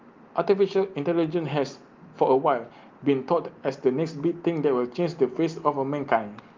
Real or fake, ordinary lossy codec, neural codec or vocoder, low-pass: real; Opus, 32 kbps; none; 7.2 kHz